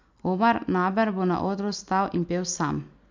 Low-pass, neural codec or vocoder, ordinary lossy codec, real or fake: 7.2 kHz; none; MP3, 64 kbps; real